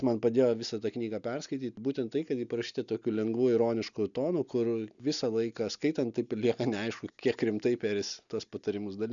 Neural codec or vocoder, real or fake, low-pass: none; real; 7.2 kHz